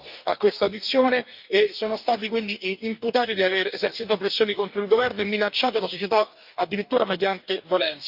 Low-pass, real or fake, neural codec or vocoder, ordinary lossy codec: 5.4 kHz; fake; codec, 44.1 kHz, 2.6 kbps, DAC; none